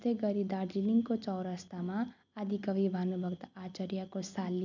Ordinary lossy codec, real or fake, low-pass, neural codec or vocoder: none; real; 7.2 kHz; none